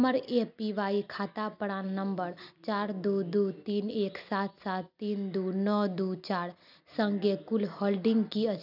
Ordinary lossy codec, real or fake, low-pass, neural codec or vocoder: none; real; 5.4 kHz; none